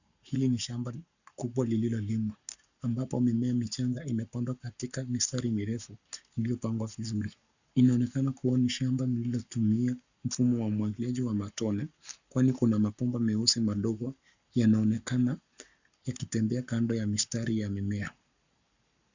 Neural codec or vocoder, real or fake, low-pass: codec, 44.1 kHz, 7.8 kbps, Pupu-Codec; fake; 7.2 kHz